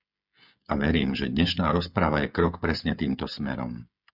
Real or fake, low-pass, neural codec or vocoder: fake; 5.4 kHz; codec, 16 kHz, 16 kbps, FreqCodec, smaller model